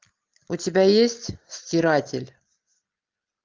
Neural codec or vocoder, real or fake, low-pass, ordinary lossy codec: none; real; 7.2 kHz; Opus, 24 kbps